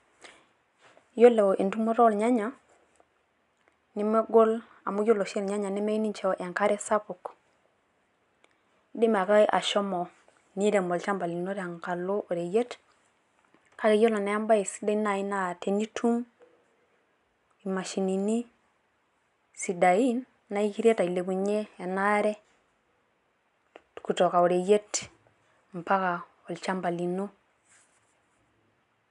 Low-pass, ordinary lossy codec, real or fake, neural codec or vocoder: 10.8 kHz; none; real; none